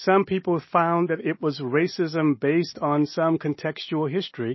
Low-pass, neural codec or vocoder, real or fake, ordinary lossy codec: 7.2 kHz; none; real; MP3, 24 kbps